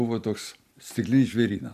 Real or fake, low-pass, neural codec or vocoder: real; 14.4 kHz; none